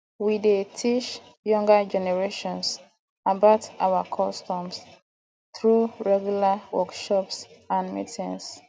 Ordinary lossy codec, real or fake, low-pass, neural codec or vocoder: none; real; none; none